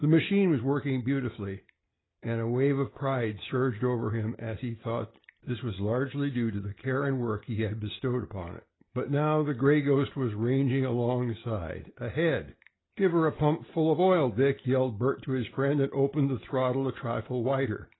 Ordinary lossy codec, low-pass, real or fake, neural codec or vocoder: AAC, 16 kbps; 7.2 kHz; real; none